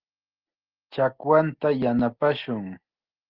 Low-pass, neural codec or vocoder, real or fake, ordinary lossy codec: 5.4 kHz; none; real; Opus, 16 kbps